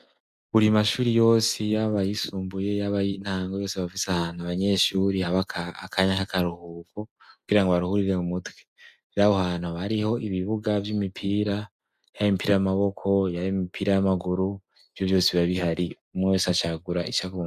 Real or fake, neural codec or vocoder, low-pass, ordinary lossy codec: real; none; 14.4 kHz; Opus, 64 kbps